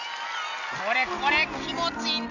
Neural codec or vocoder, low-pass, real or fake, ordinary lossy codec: none; 7.2 kHz; real; none